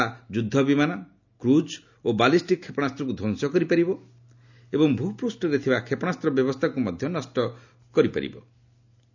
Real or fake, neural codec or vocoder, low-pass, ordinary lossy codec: real; none; 7.2 kHz; none